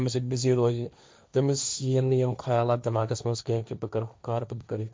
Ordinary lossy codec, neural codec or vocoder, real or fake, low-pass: none; codec, 16 kHz, 1.1 kbps, Voila-Tokenizer; fake; none